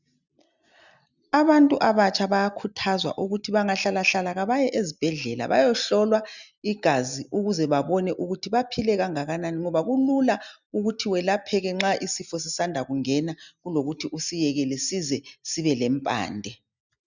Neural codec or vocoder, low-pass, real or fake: none; 7.2 kHz; real